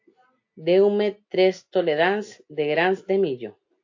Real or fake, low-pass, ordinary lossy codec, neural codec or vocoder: real; 7.2 kHz; AAC, 48 kbps; none